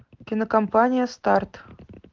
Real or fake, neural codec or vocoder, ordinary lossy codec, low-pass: real; none; Opus, 16 kbps; 7.2 kHz